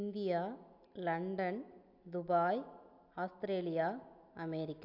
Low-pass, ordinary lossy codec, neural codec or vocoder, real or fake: 5.4 kHz; AAC, 48 kbps; none; real